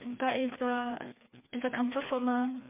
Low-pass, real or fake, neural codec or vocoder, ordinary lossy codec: 3.6 kHz; fake; codec, 16 kHz, 2 kbps, FreqCodec, larger model; MP3, 32 kbps